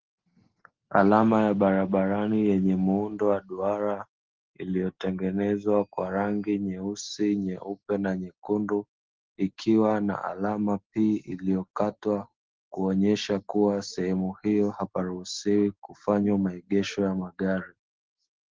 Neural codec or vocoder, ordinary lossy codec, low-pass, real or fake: none; Opus, 16 kbps; 7.2 kHz; real